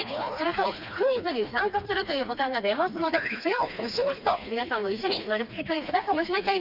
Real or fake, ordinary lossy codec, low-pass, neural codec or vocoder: fake; none; 5.4 kHz; codec, 16 kHz, 2 kbps, FreqCodec, smaller model